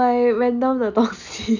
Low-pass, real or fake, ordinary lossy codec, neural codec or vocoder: 7.2 kHz; real; none; none